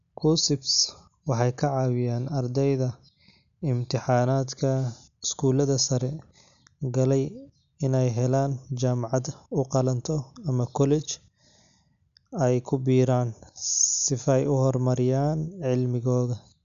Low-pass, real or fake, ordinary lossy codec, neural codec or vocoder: 7.2 kHz; real; none; none